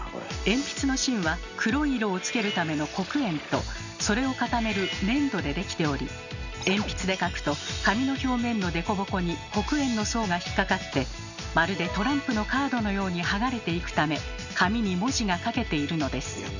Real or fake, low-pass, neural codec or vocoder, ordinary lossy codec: real; 7.2 kHz; none; none